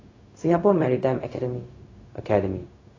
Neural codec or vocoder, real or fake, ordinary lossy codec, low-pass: codec, 16 kHz, 0.4 kbps, LongCat-Audio-Codec; fake; AAC, 32 kbps; 7.2 kHz